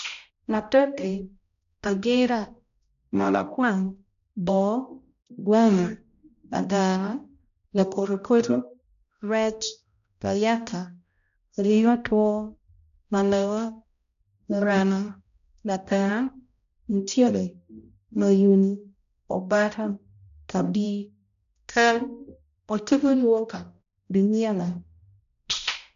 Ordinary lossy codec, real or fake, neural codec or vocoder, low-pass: none; fake; codec, 16 kHz, 0.5 kbps, X-Codec, HuBERT features, trained on balanced general audio; 7.2 kHz